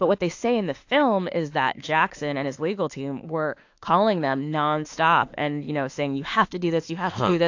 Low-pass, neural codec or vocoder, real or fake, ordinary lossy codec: 7.2 kHz; autoencoder, 48 kHz, 32 numbers a frame, DAC-VAE, trained on Japanese speech; fake; AAC, 48 kbps